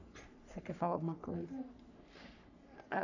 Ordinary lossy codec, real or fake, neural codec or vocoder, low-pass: none; fake; codec, 44.1 kHz, 3.4 kbps, Pupu-Codec; 7.2 kHz